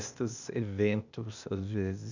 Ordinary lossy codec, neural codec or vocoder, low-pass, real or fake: none; codec, 16 kHz, 0.8 kbps, ZipCodec; 7.2 kHz; fake